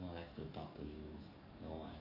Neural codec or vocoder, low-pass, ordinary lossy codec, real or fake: codec, 44.1 kHz, 7.8 kbps, DAC; 5.4 kHz; none; fake